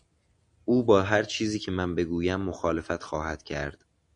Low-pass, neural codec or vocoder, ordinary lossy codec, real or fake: 10.8 kHz; none; AAC, 64 kbps; real